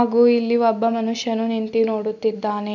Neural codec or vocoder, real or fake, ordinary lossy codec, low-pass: none; real; none; 7.2 kHz